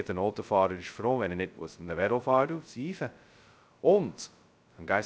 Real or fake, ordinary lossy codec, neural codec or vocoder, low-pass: fake; none; codec, 16 kHz, 0.2 kbps, FocalCodec; none